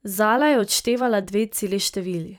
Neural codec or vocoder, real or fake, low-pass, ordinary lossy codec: vocoder, 44.1 kHz, 128 mel bands every 256 samples, BigVGAN v2; fake; none; none